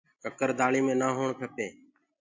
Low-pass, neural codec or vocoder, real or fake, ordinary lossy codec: 7.2 kHz; none; real; MP3, 48 kbps